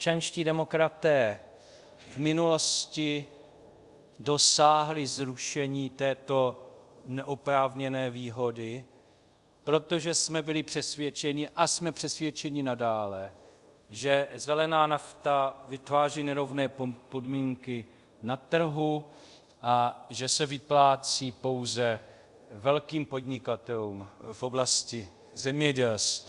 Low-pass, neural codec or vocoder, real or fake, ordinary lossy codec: 10.8 kHz; codec, 24 kHz, 0.5 kbps, DualCodec; fake; Opus, 64 kbps